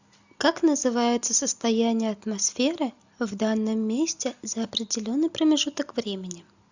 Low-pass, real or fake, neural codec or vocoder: 7.2 kHz; real; none